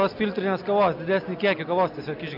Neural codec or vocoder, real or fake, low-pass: none; real; 5.4 kHz